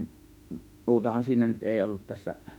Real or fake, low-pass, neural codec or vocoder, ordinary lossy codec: fake; 19.8 kHz; autoencoder, 48 kHz, 32 numbers a frame, DAC-VAE, trained on Japanese speech; none